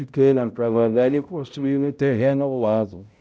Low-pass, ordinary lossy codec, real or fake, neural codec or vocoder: none; none; fake; codec, 16 kHz, 0.5 kbps, X-Codec, HuBERT features, trained on balanced general audio